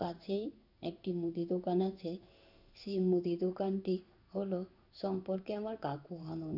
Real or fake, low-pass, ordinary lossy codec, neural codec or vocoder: fake; 5.4 kHz; none; codec, 16 kHz in and 24 kHz out, 1 kbps, XY-Tokenizer